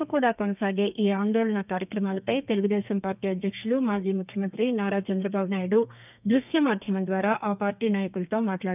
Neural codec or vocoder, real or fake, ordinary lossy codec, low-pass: codec, 32 kHz, 1.9 kbps, SNAC; fake; none; 3.6 kHz